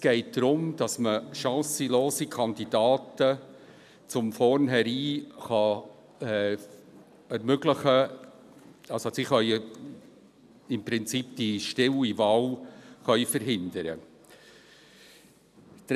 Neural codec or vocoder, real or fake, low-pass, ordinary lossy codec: none; real; 14.4 kHz; none